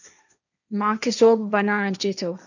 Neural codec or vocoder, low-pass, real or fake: codec, 16 kHz, 1.1 kbps, Voila-Tokenizer; 7.2 kHz; fake